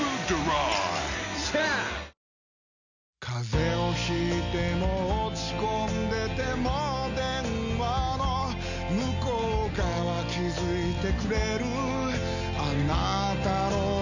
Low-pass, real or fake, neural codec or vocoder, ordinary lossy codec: 7.2 kHz; real; none; none